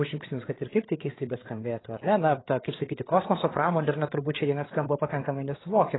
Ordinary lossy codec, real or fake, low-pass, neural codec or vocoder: AAC, 16 kbps; fake; 7.2 kHz; codec, 16 kHz, 8 kbps, FreqCodec, larger model